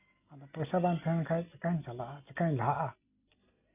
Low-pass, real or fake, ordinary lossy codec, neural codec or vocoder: 3.6 kHz; real; none; none